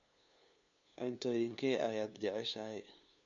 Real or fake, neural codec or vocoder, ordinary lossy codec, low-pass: fake; codec, 16 kHz, 2 kbps, FunCodec, trained on LibriTTS, 25 frames a second; MP3, 64 kbps; 7.2 kHz